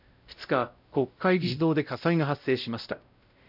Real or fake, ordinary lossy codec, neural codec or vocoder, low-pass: fake; none; codec, 16 kHz, 0.5 kbps, X-Codec, WavLM features, trained on Multilingual LibriSpeech; 5.4 kHz